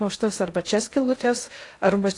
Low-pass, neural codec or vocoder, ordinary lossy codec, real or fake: 10.8 kHz; codec, 16 kHz in and 24 kHz out, 0.6 kbps, FocalCodec, streaming, 2048 codes; AAC, 48 kbps; fake